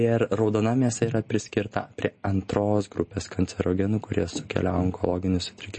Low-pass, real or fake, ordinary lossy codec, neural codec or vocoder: 10.8 kHz; real; MP3, 32 kbps; none